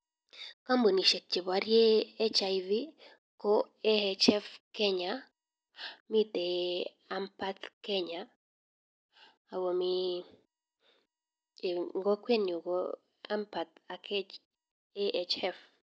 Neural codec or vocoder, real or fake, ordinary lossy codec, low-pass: none; real; none; none